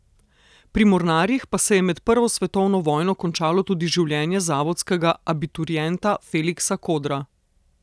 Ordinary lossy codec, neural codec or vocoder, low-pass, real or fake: none; none; none; real